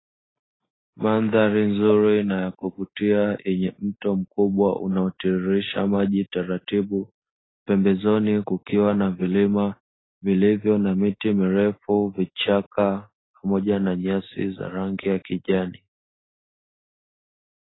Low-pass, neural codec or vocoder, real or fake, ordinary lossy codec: 7.2 kHz; none; real; AAC, 16 kbps